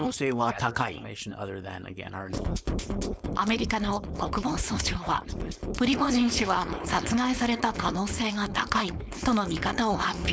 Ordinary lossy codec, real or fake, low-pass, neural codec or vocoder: none; fake; none; codec, 16 kHz, 4.8 kbps, FACodec